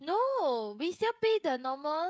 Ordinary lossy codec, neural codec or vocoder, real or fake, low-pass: none; codec, 16 kHz, 16 kbps, FreqCodec, smaller model; fake; none